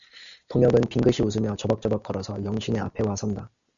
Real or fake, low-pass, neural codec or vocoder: real; 7.2 kHz; none